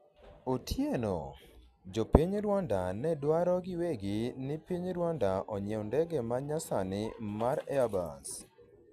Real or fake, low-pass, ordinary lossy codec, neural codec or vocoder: real; 14.4 kHz; none; none